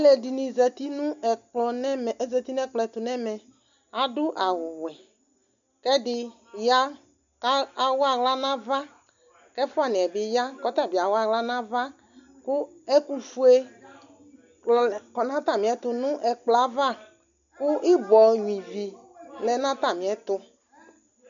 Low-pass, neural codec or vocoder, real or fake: 7.2 kHz; none; real